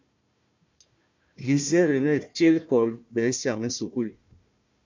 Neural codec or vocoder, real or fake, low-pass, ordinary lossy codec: codec, 16 kHz, 1 kbps, FunCodec, trained on Chinese and English, 50 frames a second; fake; 7.2 kHz; MP3, 64 kbps